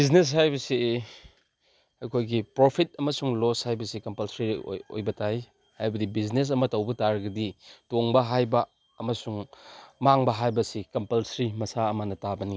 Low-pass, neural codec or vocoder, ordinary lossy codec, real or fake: none; none; none; real